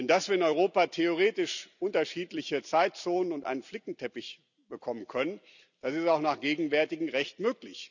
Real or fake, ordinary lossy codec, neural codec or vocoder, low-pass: real; none; none; 7.2 kHz